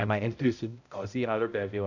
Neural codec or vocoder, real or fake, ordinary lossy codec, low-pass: codec, 16 kHz, 0.5 kbps, X-Codec, HuBERT features, trained on balanced general audio; fake; none; 7.2 kHz